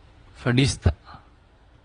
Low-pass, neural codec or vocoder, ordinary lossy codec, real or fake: 9.9 kHz; vocoder, 22.05 kHz, 80 mel bands, WaveNeXt; AAC, 32 kbps; fake